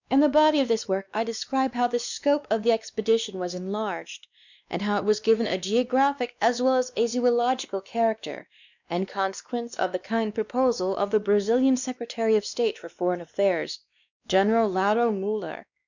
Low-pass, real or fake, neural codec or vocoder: 7.2 kHz; fake; codec, 16 kHz, 2 kbps, X-Codec, WavLM features, trained on Multilingual LibriSpeech